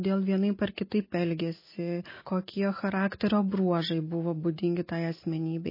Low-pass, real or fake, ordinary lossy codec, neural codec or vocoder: 5.4 kHz; real; MP3, 24 kbps; none